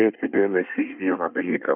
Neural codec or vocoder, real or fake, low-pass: codec, 24 kHz, 1 kbps, SNAC; fake; 3.6 kHz